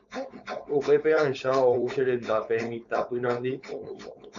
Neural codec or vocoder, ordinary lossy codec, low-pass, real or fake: codec, 16 kHz, 4.8 kbps, FACodec; MP3, 64 kbps; 7.2 kHz; fake